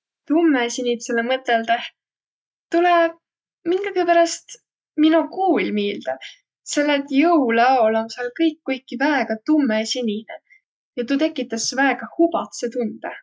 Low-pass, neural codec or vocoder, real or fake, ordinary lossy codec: none; none; real; none